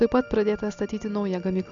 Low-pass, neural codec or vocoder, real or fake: 7.2 kHz; none; real